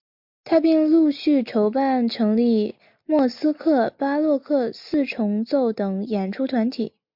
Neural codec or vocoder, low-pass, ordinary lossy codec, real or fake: none; 5.4 kHz; MP3, 48 kbps; real